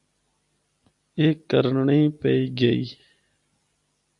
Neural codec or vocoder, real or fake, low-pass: none; real; 10.8 kHz